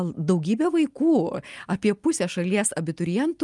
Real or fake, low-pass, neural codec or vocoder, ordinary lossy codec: real; 10.8 kHz; none; Opus, 32 kbps